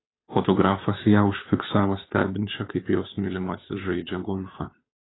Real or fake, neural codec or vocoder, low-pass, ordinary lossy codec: fake; codec, 16 kHz, 2 kbps, FunCodec, trained on Chinese and English, 25 frames a second; 7.2 kHz; AAC, 16 kbps